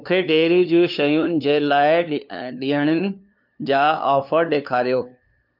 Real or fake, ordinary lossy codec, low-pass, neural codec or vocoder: fake; none; 5.4 kHz; codec, 16 kHz, 2 kbps, FunCodec, trained on LibriTTS, 25 frames a second